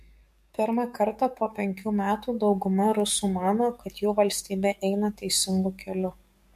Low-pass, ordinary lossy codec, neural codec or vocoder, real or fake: 14.4 kHz; MP3, 64 kbps; codec, 44.1 kHz, 7.8 kbps, DAC; fake